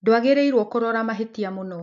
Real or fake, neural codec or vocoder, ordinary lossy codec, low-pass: real; none; none; 7.2 kHz